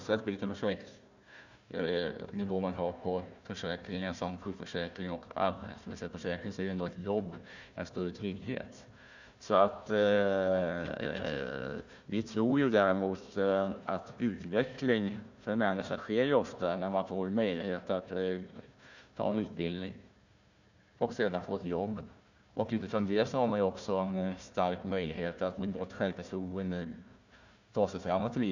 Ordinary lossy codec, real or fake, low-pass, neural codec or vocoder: none; fake; 7.2 kHz; codec, 16 kHz, 1 kbps, FunCodec, trained on Chinese and English, 50 frames a second